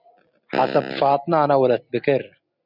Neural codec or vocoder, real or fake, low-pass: none; real; 5.4 kHz